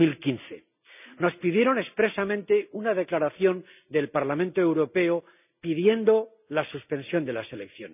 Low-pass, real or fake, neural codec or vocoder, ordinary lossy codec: 3.6 kHz; real; none; none